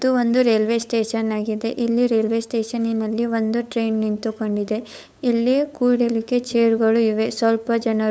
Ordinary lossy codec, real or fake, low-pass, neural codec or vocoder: none; fake; none; codec, 16 kHz, 8 kbps, FunCodec, trained on LibriTTS, 25 frames a second